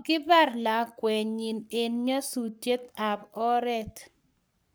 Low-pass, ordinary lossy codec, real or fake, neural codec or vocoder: none; none; fake; codec, 44.1 kHz, 7.8 kbps, Pupu-Codec